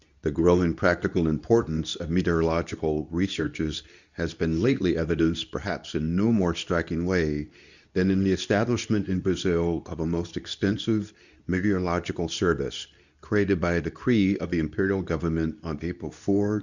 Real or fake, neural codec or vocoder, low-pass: fake; codec, 24 kHz, 0.9 kbps, WavTokenizer, medium speech release version 2; 7.2 kHz